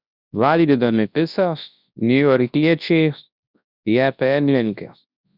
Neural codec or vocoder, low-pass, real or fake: codec, 24 kHz, 0.9 kbps, WavTokenizer, large speech release; 5.4 kHz; fake